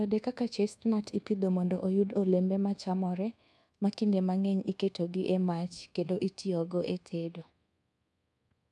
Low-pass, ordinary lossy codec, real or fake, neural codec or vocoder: none; none; fake; codec, 24 kHz, 1.2 kbps, DualCodec